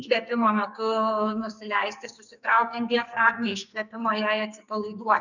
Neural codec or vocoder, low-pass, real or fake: codec, 44.1 kHz, 2.6 kbps, SNAC; 7.2 kHz; fake